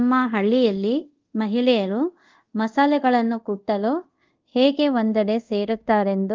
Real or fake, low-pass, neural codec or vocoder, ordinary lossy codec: fake; 7.2 kHz; codec, 24 kHz, 0.5 kbps, DualCodec; Opus, 24 kbps